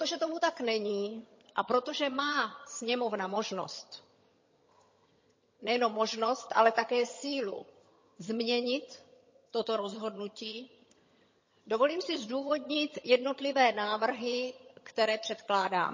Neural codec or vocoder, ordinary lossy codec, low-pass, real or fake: vocoder, 22.05 kHz, 80 mel bands, HiFi-GAN; MP3, 32 kbps; 7.2 kHz; fake